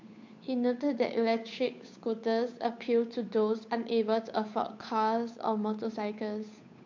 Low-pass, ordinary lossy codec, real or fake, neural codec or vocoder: 7.2 kHz; MP3, 48 kbps; fake; codec, 16 kHz, 8 kbps, FunCodec, trained on Chinese and English, 25 frames a second